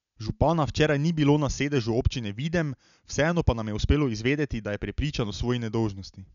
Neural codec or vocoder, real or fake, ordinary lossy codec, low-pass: none; real; MP3, 96 kbps; 7.2 kHz